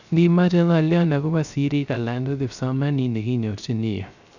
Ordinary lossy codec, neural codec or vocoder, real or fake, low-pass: none; codec, 16 kHz, 0.3 kbps, FocalCodec; fake; 7.2 kHz